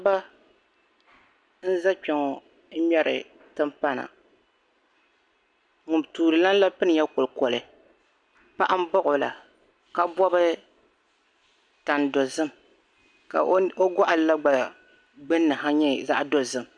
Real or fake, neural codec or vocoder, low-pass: real; none; 9.9 kHz